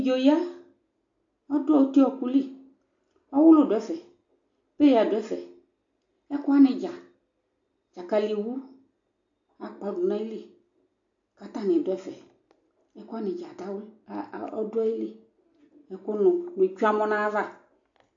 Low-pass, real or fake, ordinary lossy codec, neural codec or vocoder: 7.2 kHz; real; AAC, 48 kbps; none